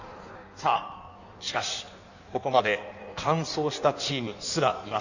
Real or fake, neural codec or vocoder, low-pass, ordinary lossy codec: fake; codec, 16 kHz in and 24 kHz out, 1.1 kbps, FireRedTTS-2 codec; 7.2 kHz; none